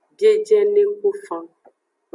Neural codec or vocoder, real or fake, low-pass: none; real; 10.8 kHz